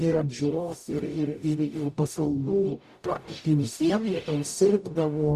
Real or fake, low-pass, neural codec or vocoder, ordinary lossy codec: fake; 14.4 kHz; codec, 44.1 kHz, 0.9 kbps, DAC; Opus, 64 kbps